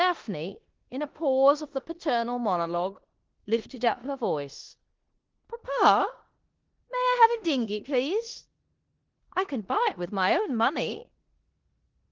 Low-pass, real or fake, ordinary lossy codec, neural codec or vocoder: 7.2 kHz; fake; Opus, 16 kbps; codec, 16 kHz in and 24 kHz out, 0.9 kbps, LongCat-Audio-Codec, four codebook decoder